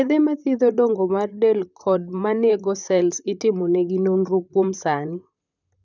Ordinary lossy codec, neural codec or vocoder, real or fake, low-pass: none; vocoder, 44.1 kHz, 128 mel bands, Pupu-Vocoder; fake; 7.2 kHz